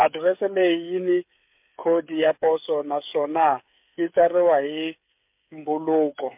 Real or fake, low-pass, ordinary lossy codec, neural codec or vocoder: fake; 3.6 kHz; MP3, 32 kbps; codec, 16 kHz, 8 kbps, FreqCodec, smaller model